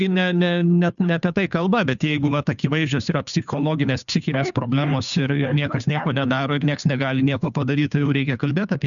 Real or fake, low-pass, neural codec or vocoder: fake; 7.2 kHz; codec, 16 kHz, 2 kbps, FunCodec, trained on Chinese and English, 25 frames a second